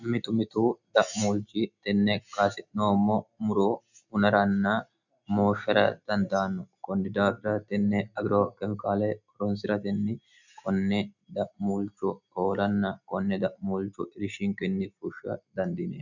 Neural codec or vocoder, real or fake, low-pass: none; real; 7.2 kHz